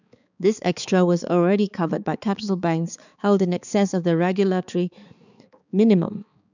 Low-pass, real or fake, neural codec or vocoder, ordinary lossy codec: 7.2 kHz; fake; codec, 16 kHz, 4 kbps, X-Codec, HuBERT features, trained on balanced general audio; none